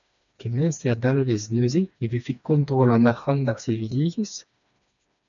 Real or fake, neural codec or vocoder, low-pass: fake; codec, 16 kHz, 2 kbps, FreqCodec, smaller model; 7.2 kHz